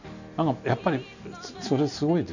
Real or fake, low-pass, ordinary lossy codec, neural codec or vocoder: real; 7.2 kHz; none; none